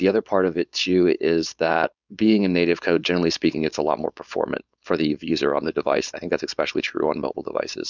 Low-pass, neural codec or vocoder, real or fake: 7.2 kHz; codec, 16 kHz, 4.8 kbps, FACodec; fake